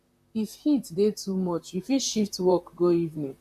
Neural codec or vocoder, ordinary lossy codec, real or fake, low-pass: vocoder, 44.1 kHz, 128 mel bands, Pupu-Vocoder; none; fake; 14.4 kHz